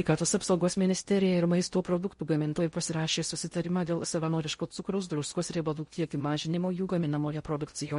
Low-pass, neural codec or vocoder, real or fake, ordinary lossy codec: 10.8 kHz; codec, 16 kHz in and 24 kHz out, 0.6 kbps, FocalCodec, streaming, 4096 codes; fake; MP3, 48 kbps